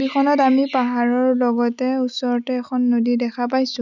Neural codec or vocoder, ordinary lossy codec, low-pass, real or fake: none; none; 7.2 kHz; real